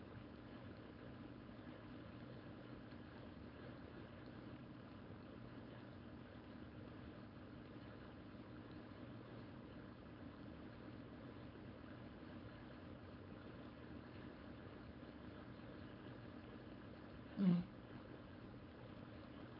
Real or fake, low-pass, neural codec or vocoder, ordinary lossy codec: fake; 5.4 kHz; codec, 16 kHz, 4.8 kbps, FACodec; none